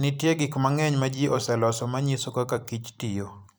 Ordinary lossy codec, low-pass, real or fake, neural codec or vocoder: none; none; fake; vocoder, 44.1 kHz, 128 mel bands every 256 samples, BigVGAN v2